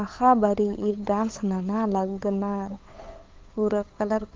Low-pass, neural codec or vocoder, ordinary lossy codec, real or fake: 7.2 kHz; codec, 16 kHz, 8 kbps, FunCodec, trained on Chinese and English, 25 frames a second; Opus, 24 kbps; fake